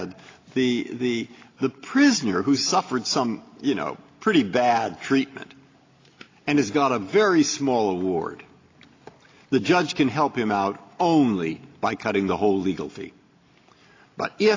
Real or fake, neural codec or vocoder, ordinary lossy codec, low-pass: real; none; AAC, 32 kbps; 7.2 kHz